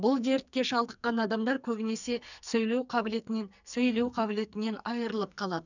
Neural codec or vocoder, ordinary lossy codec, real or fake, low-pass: codec, 44.1 kHz, 2.6 kbps, SNAC; none; fake; 7.2 kHz